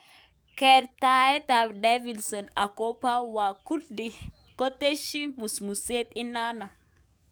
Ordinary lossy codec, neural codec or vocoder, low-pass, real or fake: none; codec, 44.1 kHz, 7.8 kbps, DAC; none; fake